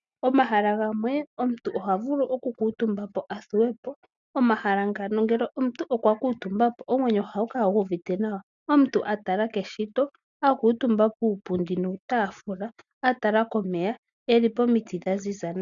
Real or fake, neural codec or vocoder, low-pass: real; none; 7.2 kHz